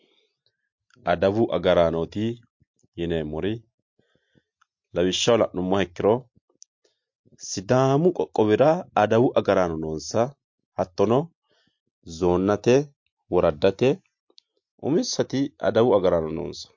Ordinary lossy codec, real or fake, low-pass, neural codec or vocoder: MP3, 48 kbps; real; 7.2 kHz; none